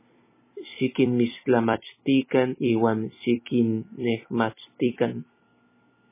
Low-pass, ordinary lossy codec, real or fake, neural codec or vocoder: 3.6 kHz; MP3, 16 kbps; fake; vocoder, 44.1 kHz, 128 mel bands every 256 samples, BigVGAN v2